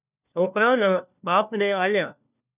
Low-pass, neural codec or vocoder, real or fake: 3.6 kHz; codec, 16 kHz, 1 kbps, FunCodec, trained on LibriTTS, 50 frames a second; fake